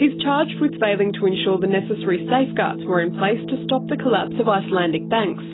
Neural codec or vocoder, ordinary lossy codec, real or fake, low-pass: none; AAC, 16 kbps; real; 7.2 kHz